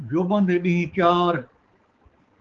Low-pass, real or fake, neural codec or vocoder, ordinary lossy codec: 7.2 kHz; fake; codec, 16 kHz, 4 kbps, X-Codec, HuBERT features, trained on balanced general audio; Opus, 16 kbps